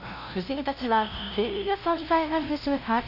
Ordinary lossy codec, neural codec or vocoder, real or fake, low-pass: none; codec, 16 kHz, 0.5 kbps, FunCodec, trained on LibriTTS, 25 frames a second; fake; 5.4 kHz